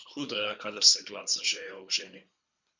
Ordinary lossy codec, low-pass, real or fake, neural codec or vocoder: MP3, 64 kbps; 7.2 kHz; fake; codec, 24 kHz, 3 kbps, HILCodec